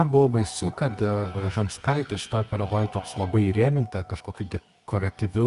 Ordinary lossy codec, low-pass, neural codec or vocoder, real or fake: Opus, 64 kbps; 10.8 kHz; codec, 24 kHz, 0.9 kbps, WavTokenizer, medium music audio release; fake